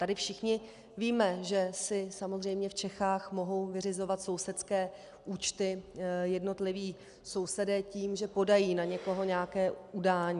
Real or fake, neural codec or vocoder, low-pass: real; none; 10.8 kHz